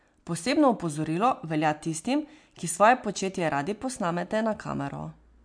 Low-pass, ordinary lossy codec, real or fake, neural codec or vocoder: 9.9 kHz; MP3, 64 kbps; real; none